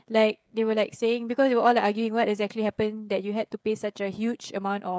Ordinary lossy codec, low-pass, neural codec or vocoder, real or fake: none; none; codec, 16 kHz, 16 kbps, FreqCodec, smaller model; fake